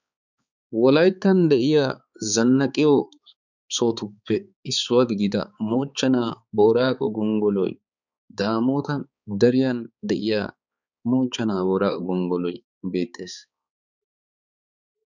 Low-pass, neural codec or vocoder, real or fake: 7.2 kHz; codec, 16 kHz, 4 kbps, X-Codec, HuBERT features, trained on balanced general audio; fake